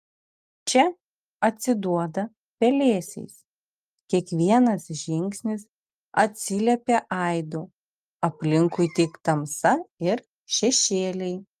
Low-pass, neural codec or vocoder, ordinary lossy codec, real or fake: 14.4 kHz; none; Opus, 32 kbps; real